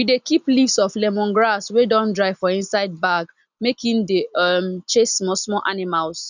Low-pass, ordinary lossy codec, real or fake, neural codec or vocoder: 7.2 kHz; none; real; none